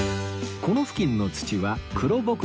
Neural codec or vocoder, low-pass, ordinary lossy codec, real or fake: none; none; none; real